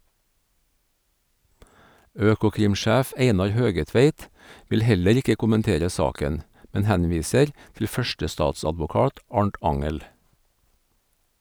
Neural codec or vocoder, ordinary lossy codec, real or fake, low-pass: none; none; real; none